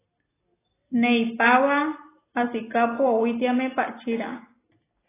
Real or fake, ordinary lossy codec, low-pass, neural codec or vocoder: real; AAC, 16 kbps; 3.6 kHz; none